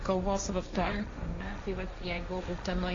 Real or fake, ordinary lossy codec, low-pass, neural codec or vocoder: fake; AAC, 32 kbps; 7.2 kHz; codec, 16 kHz, 1.1 kbps, Voila-Tokenizer